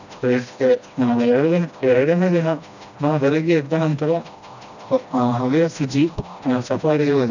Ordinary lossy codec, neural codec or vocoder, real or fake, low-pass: none; codec, 16 kHz, 1 kbps, FreqCodec, smaller model; fake; 7.2 kHz